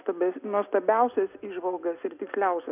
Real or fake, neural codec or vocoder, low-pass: real; none; 3.6 kHz